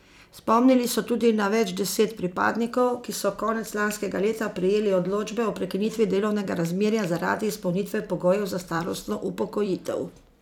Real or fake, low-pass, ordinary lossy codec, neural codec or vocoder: fake; 19.8 kHz; none; vocoder, 44.1 kHz, 128 mel bands every 256 samples, BigVGAN v2